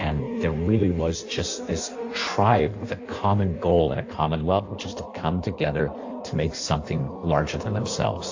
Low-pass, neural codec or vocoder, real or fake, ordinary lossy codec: 7.2 kHz; codec, 16 kHz in and 24 kHz out, 1.1 kbps, FireRedTTS-2 codec; fake; AAC, 48 kbps